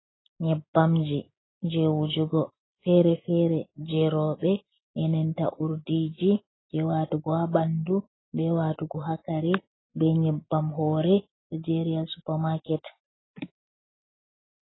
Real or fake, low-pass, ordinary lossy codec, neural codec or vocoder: real; 7.2 kHz; AAC, 16 kbps; none